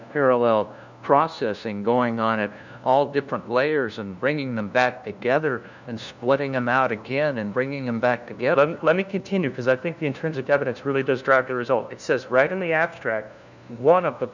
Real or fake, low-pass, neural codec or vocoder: fake; 7.2 kHz; codec, 16 kHz, 1 kbps, FunCodec, trained on LibriTTS, 50 frames a second